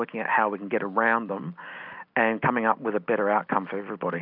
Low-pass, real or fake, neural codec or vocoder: 5.4 kHz; real; none